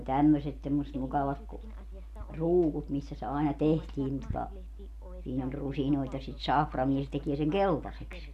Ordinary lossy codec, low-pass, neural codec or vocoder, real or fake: MP3, 96 kbps; 14.4 kHz; none; real